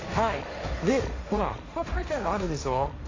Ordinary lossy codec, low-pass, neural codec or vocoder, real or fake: AAC, 32 kbps; 7.2 kHz; codec, 16 kHz, 1.1 kbps, Voila-Tokenizer; fake